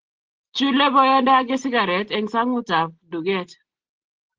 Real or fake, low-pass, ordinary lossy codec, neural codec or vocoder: real; 7.2 kHz; Opus, 16 kbps; none